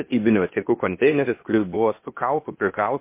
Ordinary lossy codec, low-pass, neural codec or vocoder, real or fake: MP3, 24 kbps; 3.6 kHz; codec, 16 kHz, 0.8 kbps, ZipCodec; fake